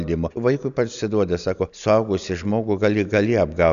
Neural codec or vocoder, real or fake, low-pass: none; real; 7.2 kHz